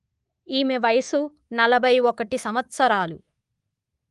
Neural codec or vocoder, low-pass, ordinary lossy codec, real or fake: codec, 24 kHz, 3.1 kbps, DualCodec; 10.8 kHz; Opus, 24 kbps; fake